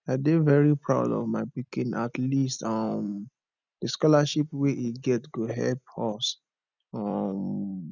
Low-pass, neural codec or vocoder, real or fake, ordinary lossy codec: 7.2 kHz; none; real; none